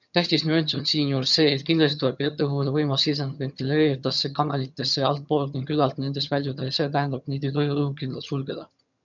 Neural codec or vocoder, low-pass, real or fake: vocoder, 22.05 kHz, 80 mel bands, HiFi-GAN; 7.2 kHz; fake